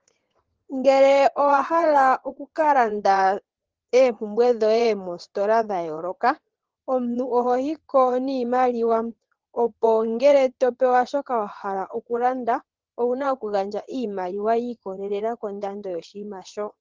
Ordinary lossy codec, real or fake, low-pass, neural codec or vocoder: Opus, 16 kbps; fake; 7.2 kHz; vocoder, 22.05 kHz, 80 mel bands, WaveNeXt